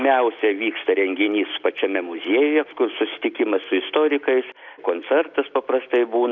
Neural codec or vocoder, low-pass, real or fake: none; 7.2 kHz; real